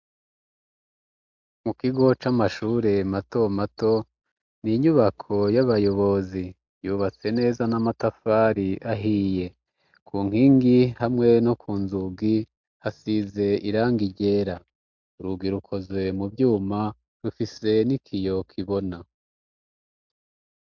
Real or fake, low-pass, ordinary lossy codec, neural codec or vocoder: real; 7.2 kHz; MP3, 64 kbps; none